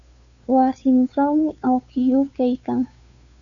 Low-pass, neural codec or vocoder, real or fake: 7.2 kHz; codec, 16 kHz, 2 kbps, FunCodec, trained on Chinese and English, 25 frames a second; fake